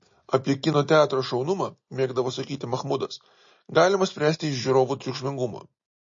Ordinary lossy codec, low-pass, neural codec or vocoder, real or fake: MP3, 32 kbps; 7.2 kHz; none; real